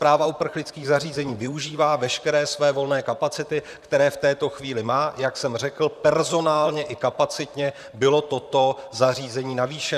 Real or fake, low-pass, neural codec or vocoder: fake; 14.4 kHz; vocoder, 44.1 kHz, 128 mel bands, Pupu-Vocoder